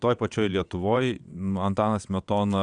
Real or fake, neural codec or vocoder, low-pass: fake; vocoder, 22.05 kHz, 80 mel bands, WaveNeXt; 9.9 kHz